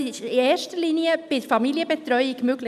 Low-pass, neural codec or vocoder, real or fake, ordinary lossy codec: 14.4 kHz; none; real; none